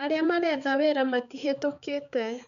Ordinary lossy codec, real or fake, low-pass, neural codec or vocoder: none; fake; 7.2 kHz; codec, 16 kHz, 4 kbps, X-Codec, HuBERT features, trained on balanced general audio